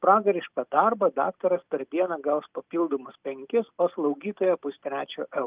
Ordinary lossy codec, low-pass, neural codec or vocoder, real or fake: Opus, 24 kbps; 3.6 kHz; none; real